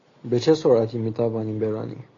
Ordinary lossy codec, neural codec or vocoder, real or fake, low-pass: AAC, 32 kbps; none; real; 7.2 kHz